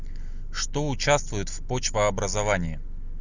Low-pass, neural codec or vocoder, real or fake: 7.2 kHz; none; real